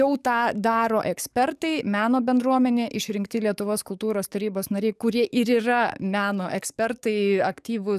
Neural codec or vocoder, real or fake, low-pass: codec, 44.1 kHz, 7.8 kbps, DAC; fake; 14.4 kHz